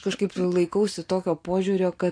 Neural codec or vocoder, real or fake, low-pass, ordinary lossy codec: none; real; 9.9 kHz; MP3, 48 kbps